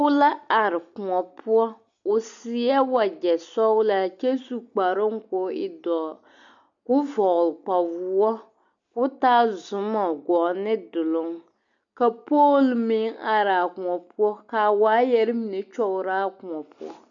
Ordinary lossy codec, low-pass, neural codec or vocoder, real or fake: MP3, 96 kbps; 7.2 kHz; none; real